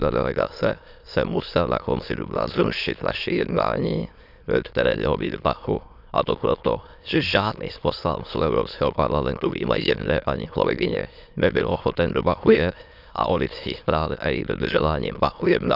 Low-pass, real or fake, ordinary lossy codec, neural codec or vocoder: 5.4 kHz; fake; none; autoencoder, 22.05 kHz, a latent of 192 numbers a frame, VITS, trained on many speakers